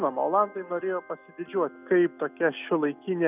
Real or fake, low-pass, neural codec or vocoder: real; 3.6 kHz; none